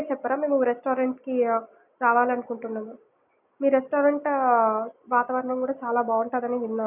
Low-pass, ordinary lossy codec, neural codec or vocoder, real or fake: 3.6 kHz; none; none; real